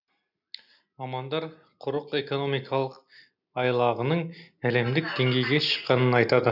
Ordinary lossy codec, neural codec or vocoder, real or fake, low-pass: none; none; real; 5.4 kHz